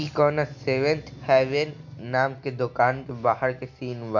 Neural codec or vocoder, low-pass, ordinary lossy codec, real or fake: none; 7.2 kHz; none; real